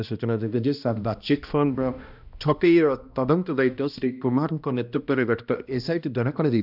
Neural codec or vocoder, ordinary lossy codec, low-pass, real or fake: codec, 16 kHz, 1 kbps, X-Codec, HuBERT features, trained on balanced general audio; none; 5.4 kHz; fake